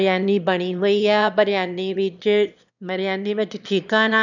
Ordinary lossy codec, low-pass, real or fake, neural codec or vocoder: none; 7.2 kHz; fake; autoencoder, 22.05 kHz, a latent of 192 numbers a frame, VITS, trained on one speaker